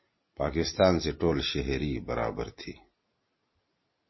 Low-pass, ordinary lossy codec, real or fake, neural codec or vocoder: 7.2 kHz; MP3, 24 kbps; real; none